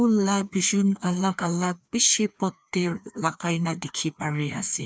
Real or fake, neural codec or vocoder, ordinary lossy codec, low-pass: fake; codec, 16 kHz, 2 kbps, FreqCodec, larger model; none; none